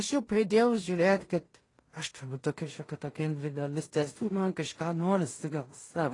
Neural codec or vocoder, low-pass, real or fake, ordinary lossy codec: codec, 16 kHz in and 24 kHz out, 0.4 kbps, LongCat-Audio-Codec, two codebook decoder; 10.8 kHz; fake; AAC, 32 kbps